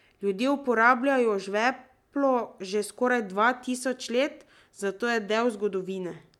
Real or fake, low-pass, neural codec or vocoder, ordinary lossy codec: real; 19.8 kHz; none; MP3, 96 kbps